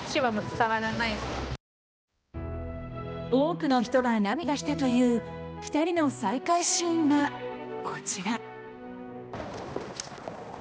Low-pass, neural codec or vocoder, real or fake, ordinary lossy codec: none; codec, 16 kHz, 1 kbps, X-Codec, HuBERT features, trained on balanced general audio; fake; none